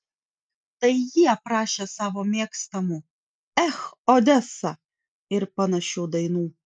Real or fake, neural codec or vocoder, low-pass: real; none; 9.9 kHz